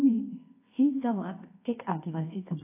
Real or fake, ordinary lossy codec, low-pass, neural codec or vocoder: fake; AAC, 24 kbps; 3.6 kHz; codec, 24 kHz, 0.9 kbps, WavTokenizer, medium music audio release